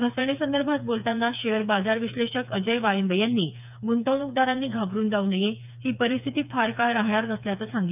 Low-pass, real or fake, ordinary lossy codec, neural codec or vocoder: 3.6 kHz; fake; none; codec, 16 kHz, 4 kbps, FreqCodec, smaller model